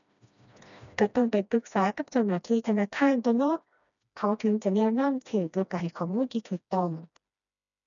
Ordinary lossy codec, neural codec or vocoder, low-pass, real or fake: none; codec, 16 kHz, 1 kbps, FreqCodec, smaller model; 7.2 kHz; fake